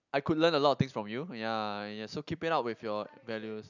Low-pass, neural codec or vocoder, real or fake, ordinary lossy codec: 7.2 kHz; none; real; none